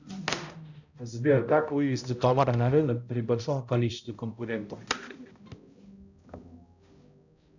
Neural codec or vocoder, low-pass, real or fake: codec, 16 kHz, 0.5 kbps, X-Codec, HuBERT features, trained on balanced general audio; 7.2 kHz; fake